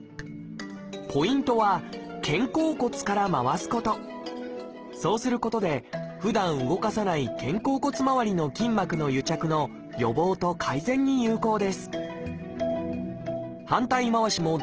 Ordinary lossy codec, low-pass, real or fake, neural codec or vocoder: Opus, 16 kbps; 7.2 kHz; real; none